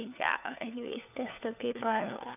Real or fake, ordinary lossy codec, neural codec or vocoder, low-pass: fake; none; codec, 16 kHz, 4 kbps, FunCodec, trained on LibriTTS, 50 frames a second; 3.6 kHz